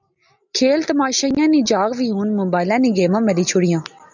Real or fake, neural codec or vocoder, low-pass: real; none; 7.2 kHz